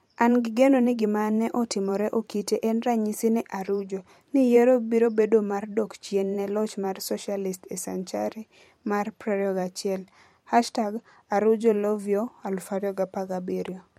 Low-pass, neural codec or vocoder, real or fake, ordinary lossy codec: 19.8 kHz; vocoder, 44.1 kHz, 128 mel bands every 512 samples, BigVGAN v2; fake; MP3, 64 kbps